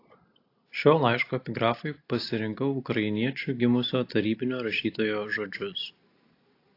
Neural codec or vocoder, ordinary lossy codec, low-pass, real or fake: none; AAC, 32 kbps; 5.4 kHz; real